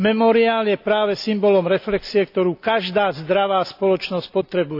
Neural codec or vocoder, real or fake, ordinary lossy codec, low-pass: none; real; none; 5.4 kHz